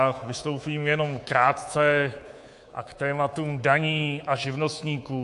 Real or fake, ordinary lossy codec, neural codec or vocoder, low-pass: fake; AAC, 64 kbps; codec, 24 kHz, 3.1 kbps, DualCodec; 10.8 kHz